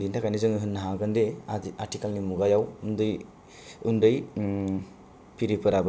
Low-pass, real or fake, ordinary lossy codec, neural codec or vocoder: none; real; none; none